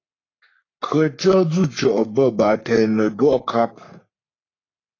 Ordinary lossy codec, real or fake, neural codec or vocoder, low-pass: AAC, 32 kbps; fake; codec, 44.1 kHz, 3.4 kbps, Pupu-Codec; 7.2 kHz